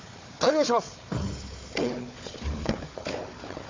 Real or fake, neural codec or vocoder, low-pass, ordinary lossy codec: fake; codec, 16 kHz, 4 kbps, FunCodec, trained on Chinese and English, 50 frames a second; 7.2 kHz; MP3, 48 kbps